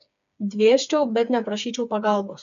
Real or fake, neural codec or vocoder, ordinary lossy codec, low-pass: fake; codec, 16 kHz, 4 kbps, FreqCodec, smaller model; AAC, 96 kbps; 7.2 kHz